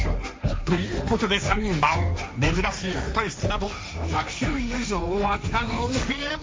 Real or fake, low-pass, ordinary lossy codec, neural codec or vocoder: fake; none; none; codec, 16 kHz, 1.1 kbps, Voila-Tokenizer